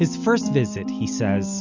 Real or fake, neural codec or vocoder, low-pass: real; none; 7.2 kHz